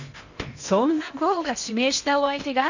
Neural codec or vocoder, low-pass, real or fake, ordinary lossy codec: codec, 16 kHz in and 24 kHz out, 0.8 kbps, FocalCodec, streaming, 65536 codes; 7.2 kHz; fake; none